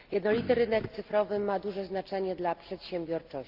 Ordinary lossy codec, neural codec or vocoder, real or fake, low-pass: Opus, 24 kbps; none; real; 5.4 kHz